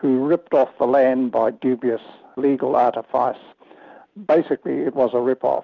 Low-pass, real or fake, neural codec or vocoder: 7.2 kHz; real; none